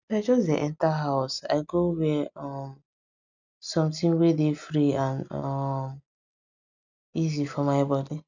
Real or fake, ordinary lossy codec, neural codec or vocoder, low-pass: real; none; none; 7.2 kHz